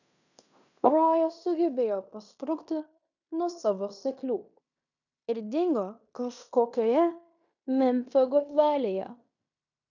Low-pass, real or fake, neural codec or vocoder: 7.2 kHz; fake; codec, 16 kHz in and 24 kHz out, 0.9 kbps, LongCat-Audio-Codec, fine tuned four codebook decoder